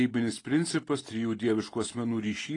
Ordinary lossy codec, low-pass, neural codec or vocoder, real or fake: AAC, 32 kbps; 10.8 kHz; none; real